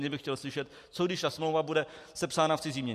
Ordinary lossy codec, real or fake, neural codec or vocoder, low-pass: MP3, 64 kbps; real; none; 14.4 kHz